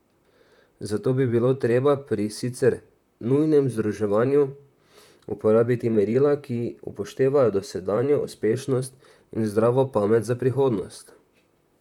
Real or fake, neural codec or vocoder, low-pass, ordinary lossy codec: fake; vocoder, 44.1 kHz, 128 mel bands, Pupu-Vocoder; 19.8 kHz; none